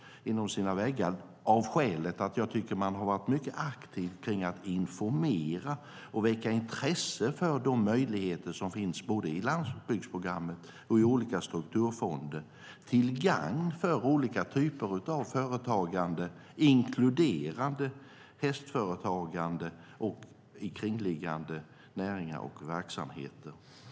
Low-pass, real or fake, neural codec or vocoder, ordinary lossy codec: none; real; none; none